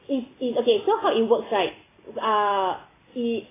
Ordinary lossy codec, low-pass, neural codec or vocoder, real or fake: AAC, 16 kbps; 3.6 kHz; none; real